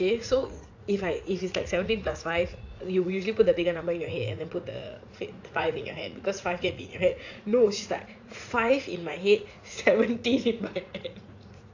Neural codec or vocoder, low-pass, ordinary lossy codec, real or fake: vocoder, 44.1 kHz, 80 mel bands, Vocos; 7.2 kHz; AAC, 48 kbps; fake